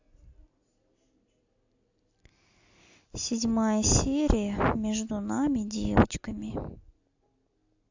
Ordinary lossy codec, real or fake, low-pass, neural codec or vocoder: AAC, 48 kbps; real; 7.2 kHz; none